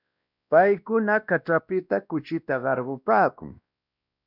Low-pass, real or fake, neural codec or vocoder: 5.4 kHz; fake; codec, 16 kHz, 1 kbps, X-Codec, WavLM features, trained on Multilingual LibriSpeech